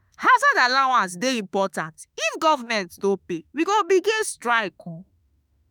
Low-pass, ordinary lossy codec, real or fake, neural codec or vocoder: none; none; fake; autoencoder, 48 kHz, 32 numbers a frame, DAC-VAE, trained on Japanese speech